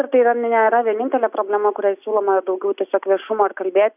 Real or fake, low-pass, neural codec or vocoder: real; 3.6 kHz; none